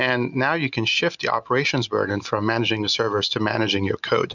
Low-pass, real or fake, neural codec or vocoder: 7.2 kHz; real; none